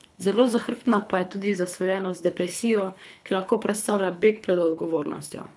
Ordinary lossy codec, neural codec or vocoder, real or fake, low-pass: none; codec, 24 kHz, 3 kbps, HILCodec; fake; none